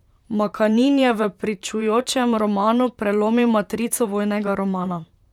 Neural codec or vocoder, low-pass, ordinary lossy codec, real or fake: vocoder, 44.1 kHz, 128 mel bands, Pupu-Vocoder; 19.8 kHz; none; fake